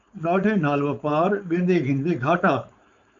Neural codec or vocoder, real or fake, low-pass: codec, 16 kHz, 4.8 kbps, FACodec; fake; 7.2 kHz